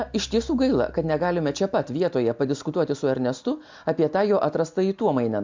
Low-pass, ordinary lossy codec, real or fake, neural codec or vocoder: 7.2 kHz; MP3, 64 kbps; real; none